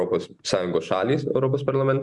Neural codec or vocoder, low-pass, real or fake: none; 10.8 kHz; real